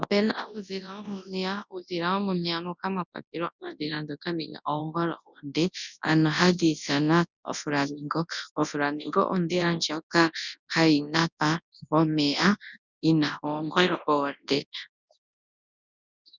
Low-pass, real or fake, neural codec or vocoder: 7.2 kHz; fake; codec, 24 kHz, 0.9 kbps, WavTokenizer, large speech release